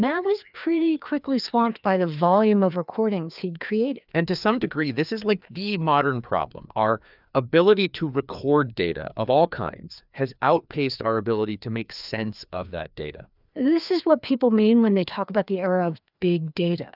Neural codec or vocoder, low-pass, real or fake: codec, 16 kHz, 2 kbps, FreqCodec, larger model; 5.4 kHz; fake